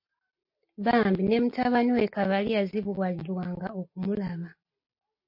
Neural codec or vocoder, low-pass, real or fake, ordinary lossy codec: none; 5.4 kHz; real; MP3, 32 kbps